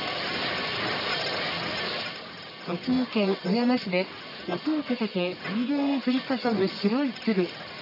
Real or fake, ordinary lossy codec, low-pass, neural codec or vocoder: fake; none; 5.4 kHz; codec, 44.1 kHz, 1.7 kbps, Pupu-Codec